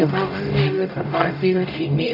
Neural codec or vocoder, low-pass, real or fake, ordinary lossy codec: codec, 44.1 kHz, 0.9 kbps, DAC; 5.4 kHz; fake; none